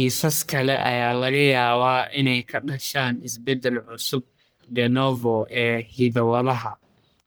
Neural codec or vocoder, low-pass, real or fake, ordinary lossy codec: codec, 44.1 kHz, 1.7 kbps, Pupu-Codec; none; fake; none